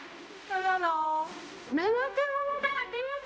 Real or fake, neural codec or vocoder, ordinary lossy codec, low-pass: fake; codec, 16 kHz, 0.5 kbps, X-Codec, HuBERT features, trained on balanced general audio; none; none